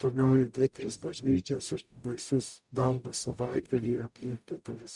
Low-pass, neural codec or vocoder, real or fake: 10.8 kHz; codec, 44.1 kHz, 0.9 kbps, DAC; fake